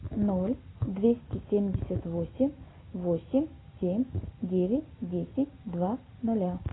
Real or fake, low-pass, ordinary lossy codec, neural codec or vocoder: real; 7.2 kHz; AAC, 16 kbps; none